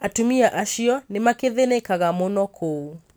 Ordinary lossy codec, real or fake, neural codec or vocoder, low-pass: none; real; none; none